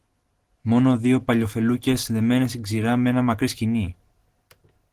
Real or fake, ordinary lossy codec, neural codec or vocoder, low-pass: real; Opus, 16 kbps; none; 14.4 kHz